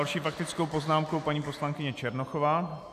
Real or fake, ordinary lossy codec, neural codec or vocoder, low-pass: real; AAC, 96 kbps; none; 14.4 kHz